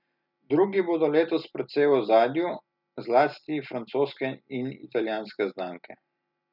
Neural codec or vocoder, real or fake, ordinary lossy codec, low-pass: none; real; none; 5.4 kHz